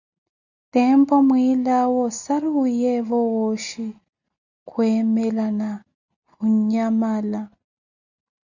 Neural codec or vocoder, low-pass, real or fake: none; 7.2 kHz; real